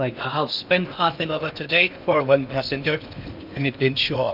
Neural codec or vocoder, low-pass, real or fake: codec, 16 kHz in and 24 kHz out, 0.8 kbps, FocalCodec, streaming, 65536 codes; 5.4 kHz; fake